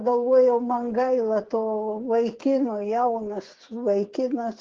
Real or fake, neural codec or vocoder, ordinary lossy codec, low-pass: fake; codec, 16 kHz, 8 kbps, FreqCodec, smaller model; Opus, 16 kbps; 7.2 kHz